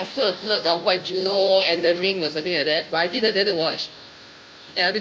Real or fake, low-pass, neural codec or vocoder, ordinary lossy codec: fake; none; codec, 16 kHz, 0.5 kbps, FunCodec, trained on Chinese and English, 25 frames a second; none